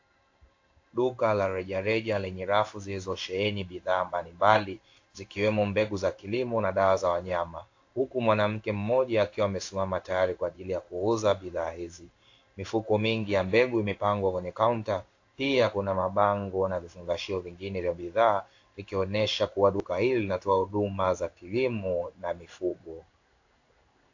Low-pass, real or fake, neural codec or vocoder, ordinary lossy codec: 7.2 kHz; fake; codec, 16 kHz in and 24 kHz out, 1 kbps, XY-Tokenizer; AAC, 48 kbps